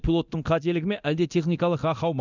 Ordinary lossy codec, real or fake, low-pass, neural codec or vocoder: none; fake; 7.2 kHz; codec, 24 kHz, 0.9 kbps, DualCodec